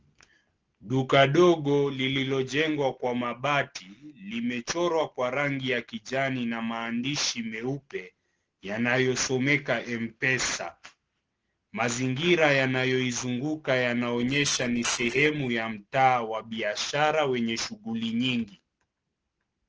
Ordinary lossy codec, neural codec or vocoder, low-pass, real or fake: Opus, 16 kbps; none; 7.2 kHz; real